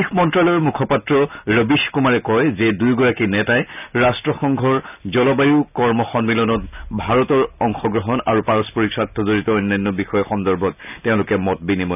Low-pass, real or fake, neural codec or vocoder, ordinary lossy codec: 3.6 kHz; real; none; none